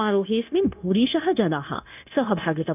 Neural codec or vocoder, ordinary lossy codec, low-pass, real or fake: codec, 16 kHz, 0.9 kbps, LongCat-Audio-Codec; none; 3.6 kHz; fake